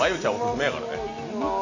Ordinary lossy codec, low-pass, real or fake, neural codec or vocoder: none; 7.2 kHz; real; none